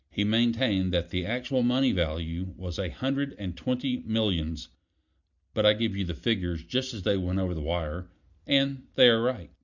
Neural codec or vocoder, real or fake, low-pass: none; real; 7.2 kHz